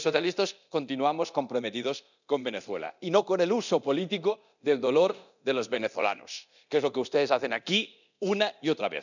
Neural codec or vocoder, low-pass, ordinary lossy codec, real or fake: codec, 24 kHz, 0.9 kbps, DualCodec; 7.2 kHz; none; fake